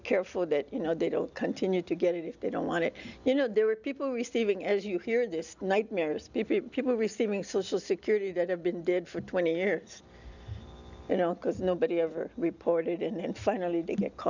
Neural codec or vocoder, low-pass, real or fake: none; 7.2 kHz; real